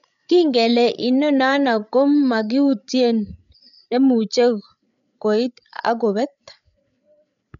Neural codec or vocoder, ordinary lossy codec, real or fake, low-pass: codec, 16 kHz, 8 kbps, FreqCodec, larger model; none; fake; 7.2 kHz